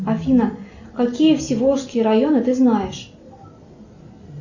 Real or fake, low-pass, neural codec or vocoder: real; 7.2 kHz; none